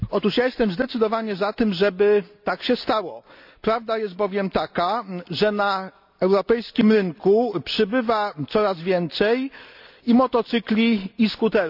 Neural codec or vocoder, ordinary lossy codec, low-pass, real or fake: none; none; 5.4 kHz; real